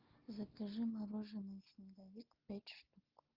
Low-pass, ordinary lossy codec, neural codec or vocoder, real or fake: 5.4 kHz; Opus, 32 kbps; none; real